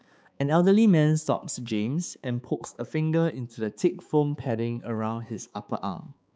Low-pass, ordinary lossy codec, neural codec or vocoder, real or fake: none; none; codec, 16 kHz, 4 kbps, X-Codec, HuBERT features, trained on balanced general audio; fake